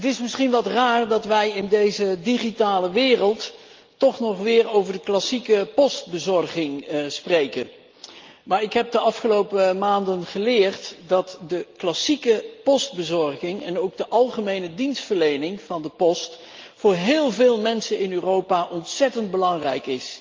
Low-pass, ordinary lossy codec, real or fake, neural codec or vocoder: 7.2 kHz; Opus, 32 kbps; real; none